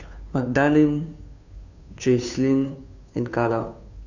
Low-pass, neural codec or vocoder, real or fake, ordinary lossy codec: 7.2 kHz; codec, 16 kHz, 2 kbps, FunCodec, trained on Chinese and English, 25 frames a second; fake; none